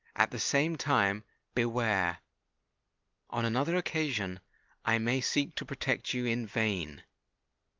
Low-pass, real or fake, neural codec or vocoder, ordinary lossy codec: 7.2 kHz; real; none; Opus, 24 kbps